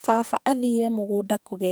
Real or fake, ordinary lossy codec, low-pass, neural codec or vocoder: fake; none; none; codec, 44.1 kHz, 2.6 kbps, SNAC